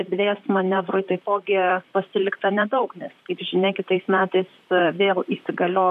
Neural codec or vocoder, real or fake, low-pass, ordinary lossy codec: vocoder, 44.1 kHz, 128 mel bands, Pupu-Vocoder; fake; 14.4 kHz; MP3, 96 kbps